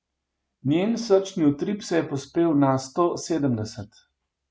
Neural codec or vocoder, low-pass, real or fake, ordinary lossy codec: none; none; real; none